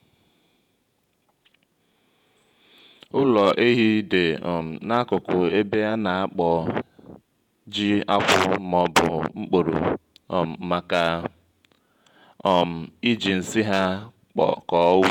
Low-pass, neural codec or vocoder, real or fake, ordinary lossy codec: 19.8 kHz; vocoder, 48 kHz, 128 mel bands, Vocos; fake; none